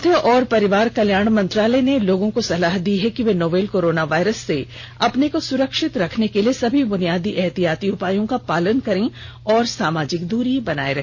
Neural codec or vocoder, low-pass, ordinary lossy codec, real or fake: none; 7.2 kHz; none; real